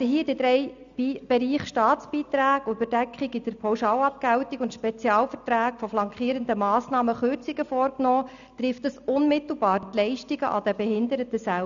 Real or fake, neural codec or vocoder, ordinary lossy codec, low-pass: real; none; none; 7.2 kHz